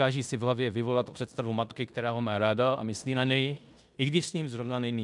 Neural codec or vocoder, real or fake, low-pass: codec, 16 kHz in and 24 kHz out, 0.9 kbps, LongCat-Audio-Codec, fine tuned four codebook decoder; fake; 10.8 kHz